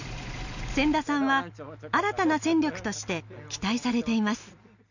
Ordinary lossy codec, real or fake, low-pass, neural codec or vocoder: none; real; 7.2 kHz; none